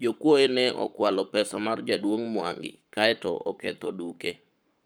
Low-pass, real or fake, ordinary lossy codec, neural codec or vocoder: none; fake; none; codec, 44.1 kHz, 7.8 kbps, Pupu-Codec